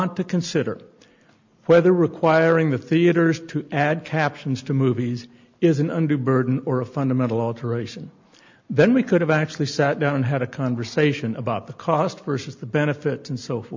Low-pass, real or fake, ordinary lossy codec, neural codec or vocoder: 7.2 kHz; real; MP3, 64 kbps; none